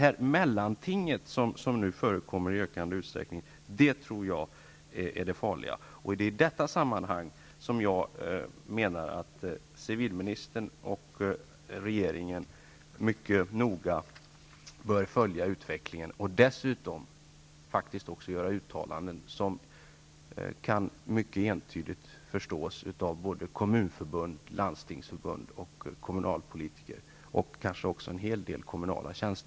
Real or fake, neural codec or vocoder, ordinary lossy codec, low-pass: real; none; none; none